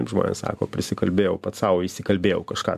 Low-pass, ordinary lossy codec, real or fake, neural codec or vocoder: 14.4 kHz; MP3, 96 kbps; real; none